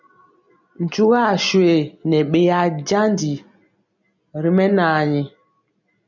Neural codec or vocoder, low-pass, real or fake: none; 7.2 kHz; real